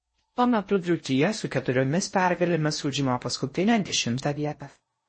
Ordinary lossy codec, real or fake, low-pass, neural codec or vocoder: MP3, 32 kbps; fake; 9.9 kHz; codec, 16 kHz in and 24 kHz out, 0.6 kbps, FocalCodec, streaming, 4096 codes